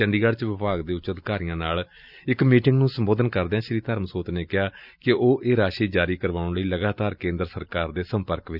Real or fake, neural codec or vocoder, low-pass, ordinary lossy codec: real; none; 5.4 kHz; none